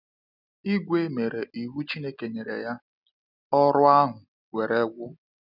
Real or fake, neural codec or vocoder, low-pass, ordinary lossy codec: real; none; 5.4 kHz; none